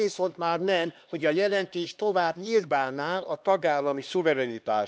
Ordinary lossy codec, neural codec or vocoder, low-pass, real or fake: none; codec, 16 kHz, 2 kbps, X-Codec, HuBERT features, trained on LibriSpeech; none; fake